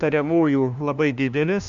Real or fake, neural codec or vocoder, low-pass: fake; codec, 16 kHz, 1 kbps, FunCodec, trained on LibriTTS, 50 frames a second; 7.2 kHz